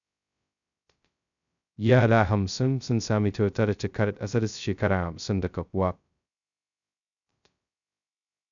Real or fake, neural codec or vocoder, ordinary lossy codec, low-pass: fake; codec, 16 kHz, 0.2 kbps, FocalCodec; none; 7.2 kHz